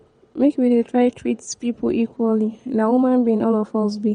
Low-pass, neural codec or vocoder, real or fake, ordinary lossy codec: 9.9 kHz; vocoder, 22.05 kHz, 80 mel bands, WaveNeXt; fake; MP3, 48 kbps